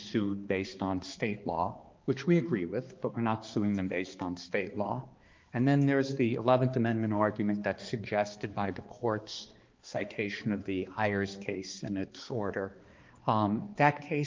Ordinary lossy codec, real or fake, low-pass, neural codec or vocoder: Opus, 32 kbps; fake; 7.2 kHz; codec, 16 kHz, 2 kbps, X-Codec, HuBERT features, trained on balanced general audio